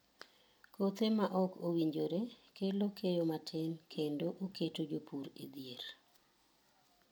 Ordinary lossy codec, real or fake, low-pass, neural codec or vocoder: none; real; none; none